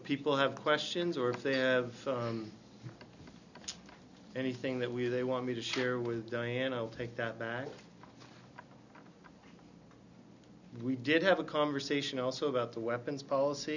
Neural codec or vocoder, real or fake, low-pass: none; real; 7.2 kHz